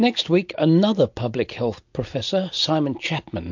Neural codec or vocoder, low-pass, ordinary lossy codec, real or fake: none; 7.2 kHz; MP3, 48 kbps; real